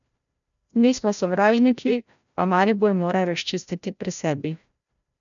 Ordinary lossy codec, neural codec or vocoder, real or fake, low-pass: none; codec, 16 kHz, 0.5 kbps, FreqCodec, larger model; fake; 7.2 kHz